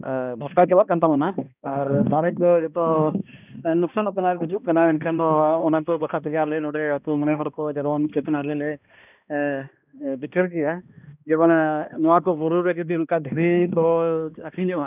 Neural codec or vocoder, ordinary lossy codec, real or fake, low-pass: codec, 16 kHz, 1 kbps, X-Codec, HuBERT features, trained on balanced general audio; none; fake; 3.6 kHz